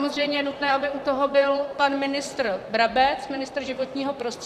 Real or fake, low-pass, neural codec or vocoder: fake; 14.4 kHz; vocoder, 44.1 kHz, 128 mel bands, Pupu-Vocoder